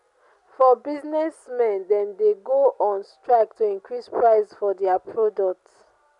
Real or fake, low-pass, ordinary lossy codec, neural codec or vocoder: real; 10.8 kHz; none; none